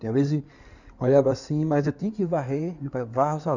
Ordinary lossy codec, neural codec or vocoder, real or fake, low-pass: none; codec, 16 kHz in and 24 kHz out, 2.2 kbps, FireRedTTS-2 codec; fake; 7.2 kHz